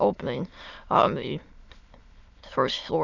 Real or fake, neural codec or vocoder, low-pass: fake; autoencoder, 22.05 kHz, a latent of 192 numbers a frame, VITS, trained on many speakers; 7.2 kHz